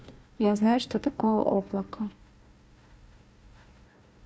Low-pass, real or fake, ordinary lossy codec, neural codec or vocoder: none; fake; none; codec, 16 kHz, 1 kbps, FunCodec, trained on Chinese and English, 50 frames a second